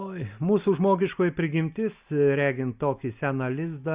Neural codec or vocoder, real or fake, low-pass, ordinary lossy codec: none; real; 3.6 kHz; Opus, 64 kbps